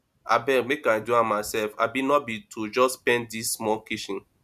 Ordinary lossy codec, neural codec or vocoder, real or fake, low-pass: MP3, 96 kbps; none; real; 14.4 kHz